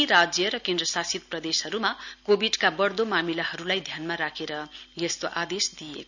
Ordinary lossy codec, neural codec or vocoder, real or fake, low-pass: none; none; real; 7.2 kHz